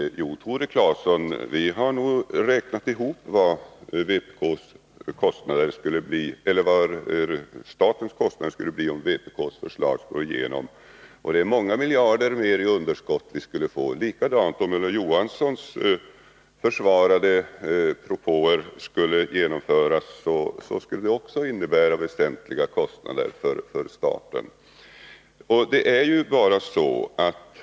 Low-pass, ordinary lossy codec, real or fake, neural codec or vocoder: none; none; real; none